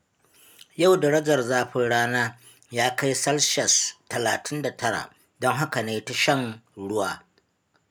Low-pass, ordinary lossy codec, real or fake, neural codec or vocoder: none; none; real; none